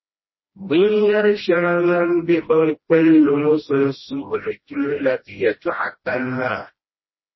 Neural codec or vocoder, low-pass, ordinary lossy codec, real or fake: codec, 16 kHz, 1 kbps, FreqCodec, smaller model; 7.2 kHz; MP3, 24 kbps; fake